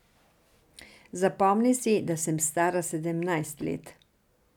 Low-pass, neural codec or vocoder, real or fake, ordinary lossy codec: 19.8 kHz; none; real; none